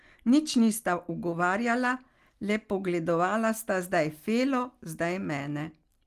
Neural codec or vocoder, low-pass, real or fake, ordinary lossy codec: none; 14.4 kHz; real; Opus, 24 kbps